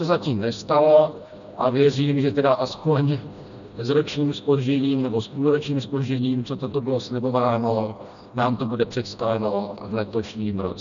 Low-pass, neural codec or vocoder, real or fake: 7.2 kHz; codec, 16 kHz, 1 kbps, FreqCodec, smaller model; fake